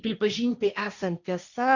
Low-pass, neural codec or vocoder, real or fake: 7.2 kHz; codec, 16 kHz, 1.1 kbps, Voila-Tokenizer; fake